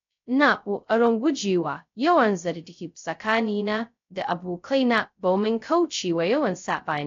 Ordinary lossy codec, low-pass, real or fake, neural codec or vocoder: AAC, 48 kbps; 7.2 kHz; fake; codec, 16 kHz, 0.2 kbps, FocalCodec